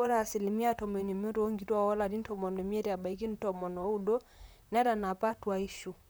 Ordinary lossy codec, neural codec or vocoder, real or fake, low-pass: none; vocoder, 44.1 kHz, 128 mel bands, Pupu-Vocoder; fake; none